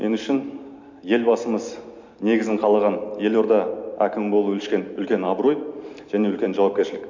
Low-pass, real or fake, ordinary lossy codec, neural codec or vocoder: 7.2 kHz; real; MP3, 64 kbps; none